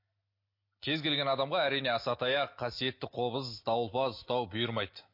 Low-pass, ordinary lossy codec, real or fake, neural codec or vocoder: 5.4 kHz; MP3, 32 kbps; real; none